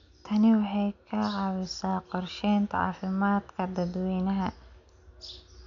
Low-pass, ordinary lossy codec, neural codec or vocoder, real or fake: 7.2 kHz; none; none; real